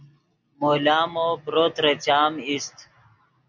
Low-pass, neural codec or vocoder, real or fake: 7.2 kHz; none; real